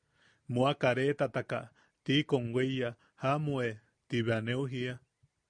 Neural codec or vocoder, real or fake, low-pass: none; real; 9.9 kHz